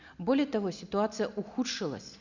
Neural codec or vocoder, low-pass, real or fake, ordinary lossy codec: none; 7.2 kHz; real; none